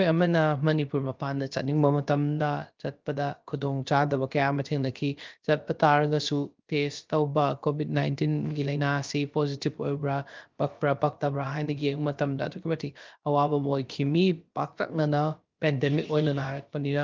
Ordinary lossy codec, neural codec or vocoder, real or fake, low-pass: Opus, 32 kbps; codec, 16 kHz, about 1 kbps, DyCAST, with the encoder's durations; fake; 7.2 kHz